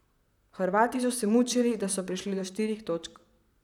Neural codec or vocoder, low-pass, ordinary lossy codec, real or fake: vocoder, 44.1 kHz, 128 mel bands, Pupu-Vocoder; 19.8 kHz; none; fake